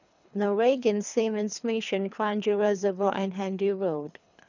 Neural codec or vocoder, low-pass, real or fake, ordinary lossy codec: codec, 24 kHz, 3 kbps, HILCodec; 7.2 kHz; fake; none